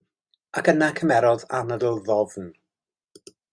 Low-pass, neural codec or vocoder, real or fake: 9.9 kHz; none; real